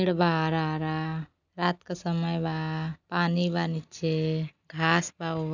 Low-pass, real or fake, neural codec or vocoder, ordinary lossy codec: 7.2 kHz; real; none; none